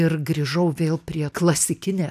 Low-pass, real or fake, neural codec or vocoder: 14.4 kHz; real; none